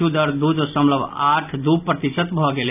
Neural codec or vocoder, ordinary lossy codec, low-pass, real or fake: none; none; 3.6 kHz; real